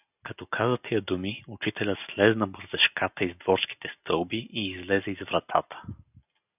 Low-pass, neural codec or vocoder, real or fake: 3.6 kHz; none; real